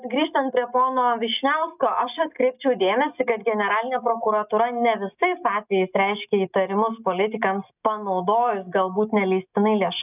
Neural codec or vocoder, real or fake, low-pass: none; real; 3.6 kHz